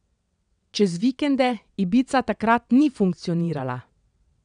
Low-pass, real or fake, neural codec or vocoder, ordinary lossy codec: 9.9 kHz; fake; vocoder, 22.05 kHz, 80 mel bands, WaveNeXt; none